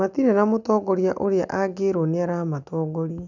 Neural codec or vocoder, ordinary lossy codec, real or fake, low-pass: none; none; real; 7.2 kHz